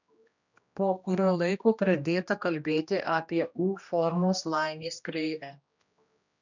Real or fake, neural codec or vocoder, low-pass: fake; codec, 16 kHz, 1 kbps, X-Codec, HuBERT features, trained on general audio; 7.2 kHz